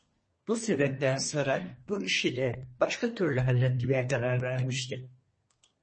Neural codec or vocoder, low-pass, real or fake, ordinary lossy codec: codec, 24 kHz, 1 kbps, SNAC; 9.9 kHz; fake; MP3, 32 kbps